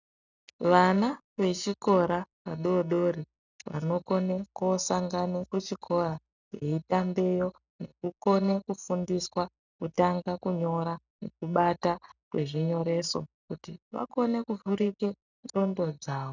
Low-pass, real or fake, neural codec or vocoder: 7.2 kHz; real; none